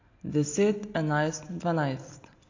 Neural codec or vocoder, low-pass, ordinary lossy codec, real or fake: none; 7.2 kHz; AAC, 32 kbps; real